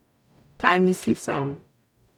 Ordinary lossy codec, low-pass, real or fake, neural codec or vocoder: none; 19.8 kHz; fake; codec, 44.1 kHz, 0.9 kbps, DAC